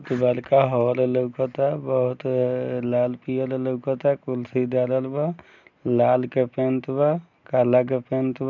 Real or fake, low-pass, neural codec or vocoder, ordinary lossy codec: real; 7.2 kHz; none; none